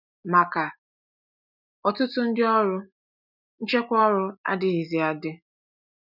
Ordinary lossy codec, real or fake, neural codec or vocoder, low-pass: none; real; none; 5.4 kHz